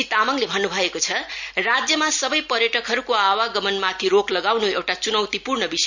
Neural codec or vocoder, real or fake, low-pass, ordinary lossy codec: none; real; 7.2 kHz; none